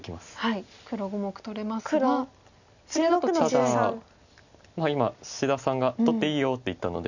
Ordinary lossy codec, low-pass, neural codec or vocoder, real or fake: none; 7.2 kHz; none; real